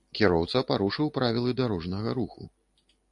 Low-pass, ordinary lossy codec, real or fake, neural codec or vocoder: 10.8 kHz; AAC, 64 kbps; real; none